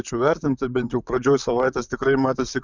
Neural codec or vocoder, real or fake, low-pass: vocoder, 44.1 kHz, 128 mel bands, Pupu-Vocoder; fake; 7.2 kHz